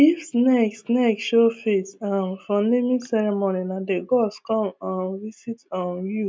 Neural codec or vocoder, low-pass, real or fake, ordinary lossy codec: none; none; real; none